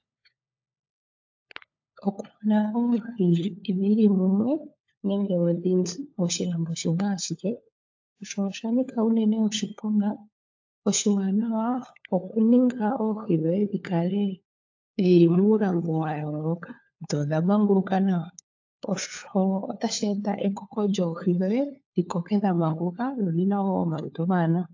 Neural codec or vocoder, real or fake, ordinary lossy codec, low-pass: codec, 16 kHz, 4 kbps, FunCodec, trained on LibriTTS, 50 frames a second; fake; AAC, 48 kbps; 7.2 kHz